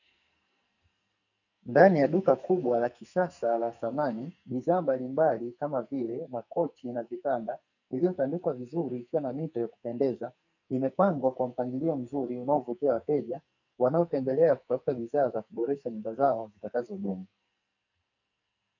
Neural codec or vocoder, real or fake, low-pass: codec, 44.1 kHz, 2.6 kbps, SNAC; fake; 7.2 kHz